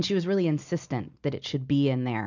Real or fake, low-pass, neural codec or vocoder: real; 7.2 kHz; none